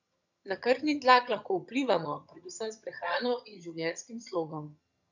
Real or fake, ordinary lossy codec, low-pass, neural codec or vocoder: fake; none; 7.2 kHz; vocoder, 22.05 kHz, 80 mel bands, HiFi-GAN